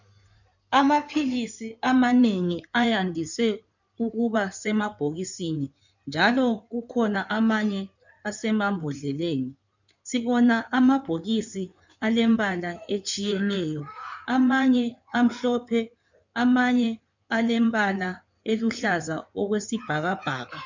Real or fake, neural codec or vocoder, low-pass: fake; codec, 16 kHz in and 24 kHz out, 2.2 kbps, FireRedTTS-2 codec; 7.2 kHz